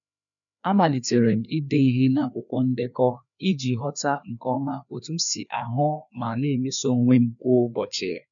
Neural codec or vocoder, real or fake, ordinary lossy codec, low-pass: codec, 16 kHz, 2 kbps, FreqCodec, larger model; fake; none; 7.2 kHz